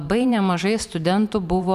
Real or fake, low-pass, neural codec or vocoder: fake; 14.4 kHz; vocoder, 44.1 kHz, 128 mel bands every 512 samples, BigVGAN v2